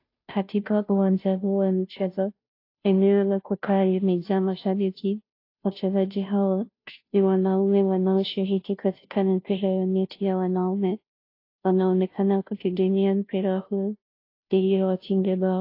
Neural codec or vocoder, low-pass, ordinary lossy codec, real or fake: codec, 16 kHz, 0.5 kbps, FunCodec, trained on Chinese and English, 25 frames a second; 5.4 kHz; AAC, 32 kbps; fake